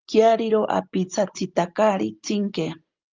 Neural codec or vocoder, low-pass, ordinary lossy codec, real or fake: none; 7.2 kHz; Opus, 32 kbps; real